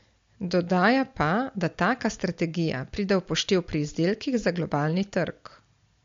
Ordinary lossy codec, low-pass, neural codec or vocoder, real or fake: MP3, 48 kbps; 7.2 kHz; none; real